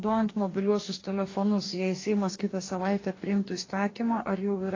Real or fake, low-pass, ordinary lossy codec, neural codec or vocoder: fake; 7.2 kHz; AAC, 32 kbps; codec, 44.1 kHz, 2.6 kbps, DAC